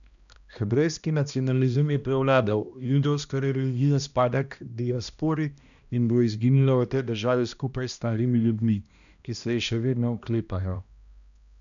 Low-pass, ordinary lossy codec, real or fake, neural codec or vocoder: 7.2 kHz; none; fake; codec, 16 kHz, 1 kbps, X-Codec, HuBERT features, trained on balanced general audio